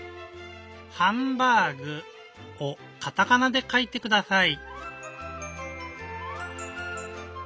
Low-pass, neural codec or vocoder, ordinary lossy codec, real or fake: none; none; none; real